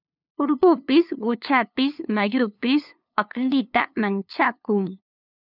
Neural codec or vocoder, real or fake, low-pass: codec, 16 kHz, 2 kbps, FunCodec, trained on LibriTTS, 25 frames a second; fake; 5.4 kHz